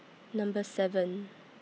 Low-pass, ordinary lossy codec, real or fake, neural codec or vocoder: none; none; real; none